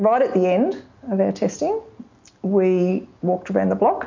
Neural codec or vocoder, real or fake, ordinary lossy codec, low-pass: none; real; MP3, 48 kbps; 7.2 kHz